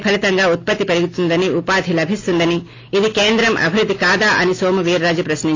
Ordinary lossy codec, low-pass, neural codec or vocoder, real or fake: AAC, 32 kbps; 7.2 kHz; none; real